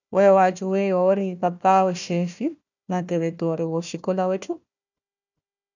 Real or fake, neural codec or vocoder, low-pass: fake; codec, 16 kHz, 1 kbps, FunCodec, trained on Chinese and English, 50 frames a second; 7.2 kHz